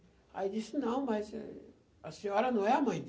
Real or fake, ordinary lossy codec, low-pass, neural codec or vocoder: real; none; none; none